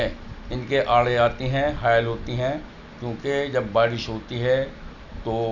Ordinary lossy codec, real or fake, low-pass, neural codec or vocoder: none; real; 7.2 kHz; none